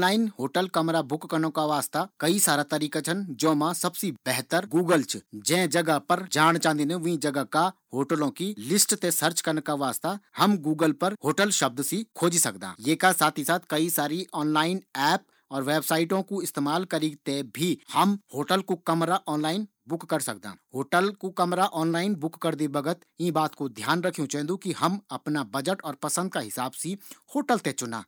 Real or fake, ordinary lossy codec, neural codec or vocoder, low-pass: real; none; none; none